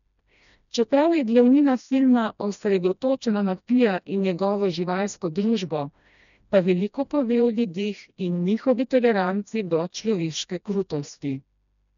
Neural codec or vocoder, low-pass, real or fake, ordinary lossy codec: codec, 16 kHz, 1 kbps, FreqCodec, smaller model; 7.2 kHz; fake; none